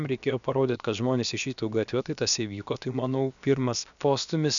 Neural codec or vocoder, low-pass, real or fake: codec, 16 kHz, about 1 kbps, DyCAST, with the encoder's durations; 7.2 kHz; fake